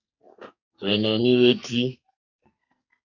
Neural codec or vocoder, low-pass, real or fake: codec, 44.1 kHz, 2.6 kbps, SNAC; 7.2 kHz; fake